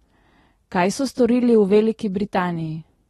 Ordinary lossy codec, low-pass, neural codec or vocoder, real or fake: AAC, 32 kbps; 19.8 kHz; none; real